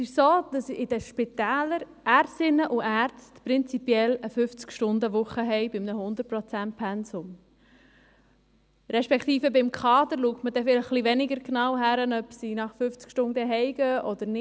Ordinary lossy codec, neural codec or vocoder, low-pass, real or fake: none; none; none; real